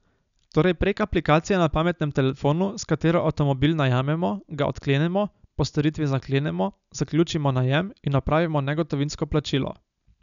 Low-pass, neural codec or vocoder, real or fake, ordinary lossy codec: 7.2 kHz; none; real; none